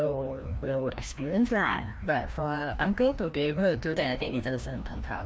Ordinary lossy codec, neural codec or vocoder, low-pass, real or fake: none; codec, 16 kHz, 1 kbps, FreqCodec, larger model; none; fake